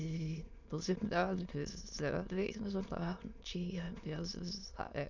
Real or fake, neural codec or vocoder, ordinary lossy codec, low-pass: fake; autoencoder, 22.05 kHz, a latent of 192 numbers a frame, VITS, trained on many speakers; none; 7.2 kHz